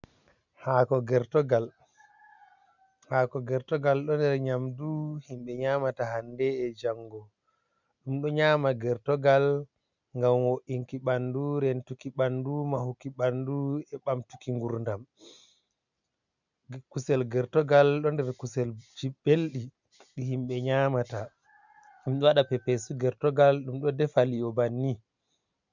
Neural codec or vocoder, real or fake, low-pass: none; real; 7.2 kHz